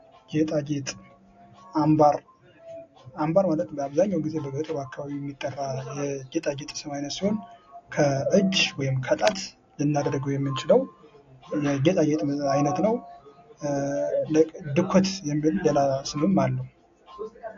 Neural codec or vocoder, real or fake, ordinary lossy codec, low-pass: none; real; AAC, 48 kbps; 7.2 kHz